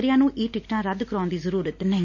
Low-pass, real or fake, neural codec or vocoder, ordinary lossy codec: 7.2 kHz; real; none; none